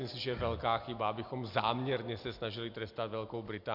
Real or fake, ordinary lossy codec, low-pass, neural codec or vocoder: real; MP3, 48 kbps; 5.4 kHz; none